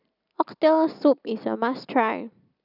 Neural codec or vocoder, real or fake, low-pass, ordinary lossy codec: none; real; 5.4 kHz; none